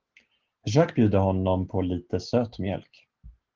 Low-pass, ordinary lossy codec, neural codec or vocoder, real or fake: 7.2 kHz; Opus, 16 kbps; none; real